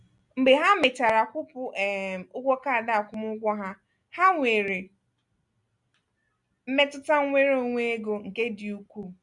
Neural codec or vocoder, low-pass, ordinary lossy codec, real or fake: none; 10.8 kHz; none; real